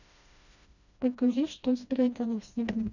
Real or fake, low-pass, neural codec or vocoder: fake; 7.2 kHz; codec, 16 kHz, 1 kbps, FreqCodec, smaller model